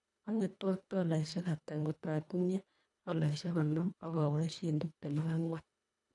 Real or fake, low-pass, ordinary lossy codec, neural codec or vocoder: fake; 10.8 kHz; none; codec, 24 kHz, 1.5 kbps, HILCodec